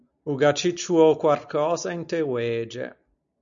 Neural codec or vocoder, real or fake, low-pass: none; real; 7.2 kHz